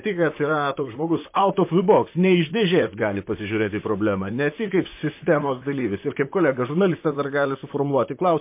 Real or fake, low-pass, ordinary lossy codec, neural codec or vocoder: fake; 3.6 kHz; MP3, 24 kbps; vocoder, 44.1 kHz, 128 mel bands, Pupu-Vocoder